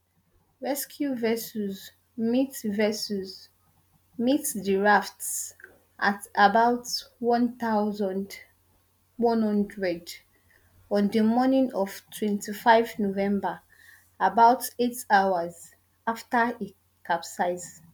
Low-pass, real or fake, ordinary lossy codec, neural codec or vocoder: none; real; none; none